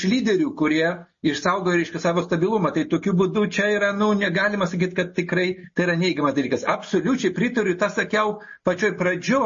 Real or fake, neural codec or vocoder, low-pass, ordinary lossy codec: real; none; 7.2 kHz; MP3, 32 kbps